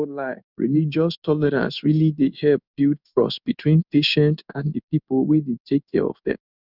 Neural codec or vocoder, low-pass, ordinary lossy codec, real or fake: codec, 16 kHz in and 24 kHz out, 1 kbps, XY-Tokenizer; 5.4 kHz; none; fake